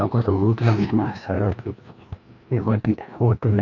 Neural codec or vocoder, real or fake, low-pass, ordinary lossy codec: codec, 16 kHz, 1 kbps, FreqCodec, larger model; fake; 7.2 kHz; MP3, 64 kbps